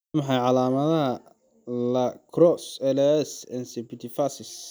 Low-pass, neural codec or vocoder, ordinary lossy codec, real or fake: none; none; none; real